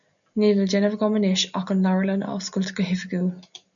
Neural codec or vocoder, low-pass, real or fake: none; 7.2 kHz; real